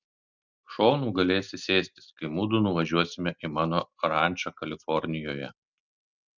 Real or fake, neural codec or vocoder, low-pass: real; none; 7.2 kHz